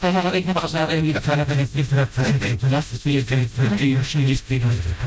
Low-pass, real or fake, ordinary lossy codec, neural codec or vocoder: none; fake; none; codec, 16 kHz, 0.5 kbps, FreqCodec, smaller model